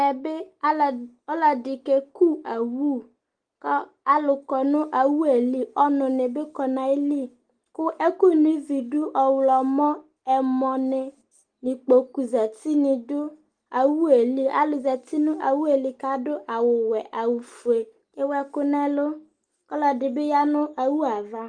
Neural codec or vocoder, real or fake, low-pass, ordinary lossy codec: none; real; 9.9 kHz; Opus, 24 kbps